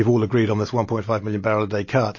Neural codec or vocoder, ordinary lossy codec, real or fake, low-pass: none; MP3, 32 kbps; real; 7.2 kHz